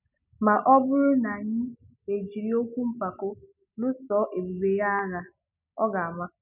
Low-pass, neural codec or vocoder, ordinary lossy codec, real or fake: 3.6 kHz; none; none; real